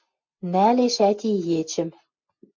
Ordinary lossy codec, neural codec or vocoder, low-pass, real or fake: MP3, 48 kbps; none; 7.2 kHz; real